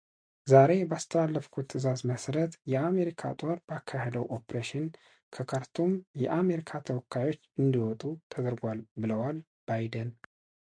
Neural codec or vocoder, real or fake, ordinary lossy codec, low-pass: none; real; MP3, 64 kbps; 9.9 kHz